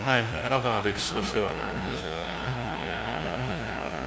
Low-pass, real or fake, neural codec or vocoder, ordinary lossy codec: none; fake; codec, 16 kHz, 0.5 kbps, FunCodec, trained on LibriTTS, 25 frames a second; none